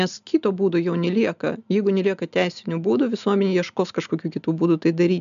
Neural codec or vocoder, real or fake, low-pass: none; real; 7.2 kHz